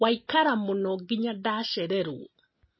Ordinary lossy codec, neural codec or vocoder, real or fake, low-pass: MP3, 24 kbps; none; real; 7.2 kHz